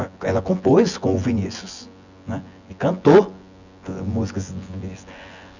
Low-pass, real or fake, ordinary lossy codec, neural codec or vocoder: 7.2 kHz; fake; none; vocoder, 24 kHz, 100 mel bands, Vocos